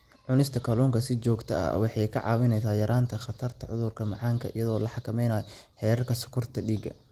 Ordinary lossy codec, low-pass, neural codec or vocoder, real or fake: Opus, 24 kbps; 14.4 kHz; vocoder, 44.1 kHz, 128 mel bands every 256 samples, BigVGAN v2; fake